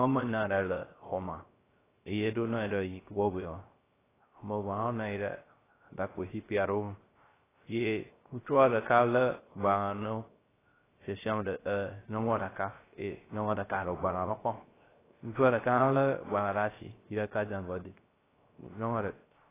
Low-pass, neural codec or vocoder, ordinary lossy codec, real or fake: 3.6 kHz; codec, 16 kHz, 0.3 kbps, FocalCodec; AAC, 16 kbps; fake